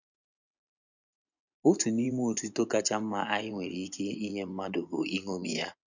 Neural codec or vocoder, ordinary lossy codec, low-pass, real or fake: vocoder, 24 kHz, 100 mel bands, Vocos; none; 7.2 kHz; fake